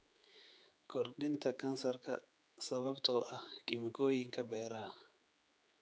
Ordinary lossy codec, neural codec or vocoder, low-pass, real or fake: none; codec, 16 kHz, 4 kbps, X-Codec, HuBERT features, trained on general audio; none; fake